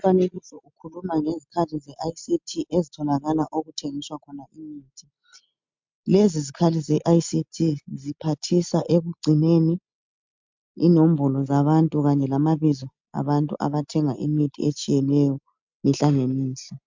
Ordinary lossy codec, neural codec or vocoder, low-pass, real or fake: MP3, 64 kbps; none; 7.2 kHz; real